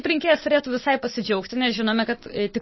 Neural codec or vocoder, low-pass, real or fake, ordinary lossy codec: none; 7.2 kHz; real; MP3, 24 kbps